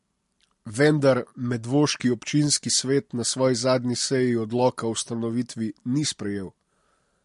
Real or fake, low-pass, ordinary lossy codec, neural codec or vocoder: real; 14.4 kHz; MP3, 48 kbps; none